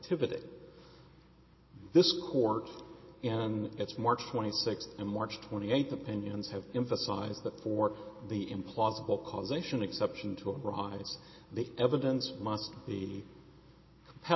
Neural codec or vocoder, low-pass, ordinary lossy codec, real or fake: none; 7.2 kHz; MP3, 24 kbps; real